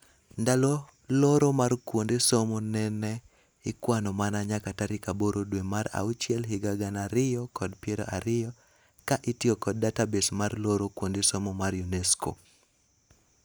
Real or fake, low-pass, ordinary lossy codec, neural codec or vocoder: real; none; none; none